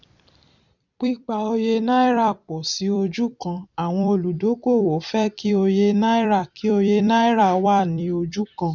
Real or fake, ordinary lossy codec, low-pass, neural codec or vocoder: fake; none; 7.2 kHz; vocoder, 44.1 kHz, 128 mel bands every 256 samples, BigVGAN v2